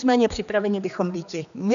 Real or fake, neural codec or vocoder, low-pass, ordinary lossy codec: fake; codec, 16 kHz, 2 kbps, X-Codec, HuBERT features, trained on general audio; 7.2 kHz; MP3, 96 kbps